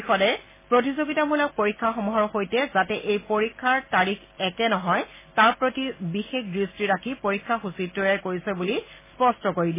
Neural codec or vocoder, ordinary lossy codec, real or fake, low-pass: none; MP3, 16 kbps; real; 3.6 kHz